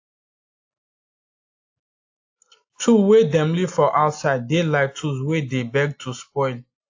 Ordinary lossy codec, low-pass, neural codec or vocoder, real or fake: AAC, 48 kbps; 7.2 kHz; none; real